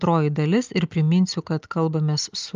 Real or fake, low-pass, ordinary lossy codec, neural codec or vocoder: real; 7.2 kHz; Opus, 24 kbps; none